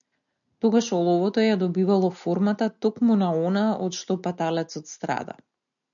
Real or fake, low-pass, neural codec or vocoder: real; 7.2 kHz; none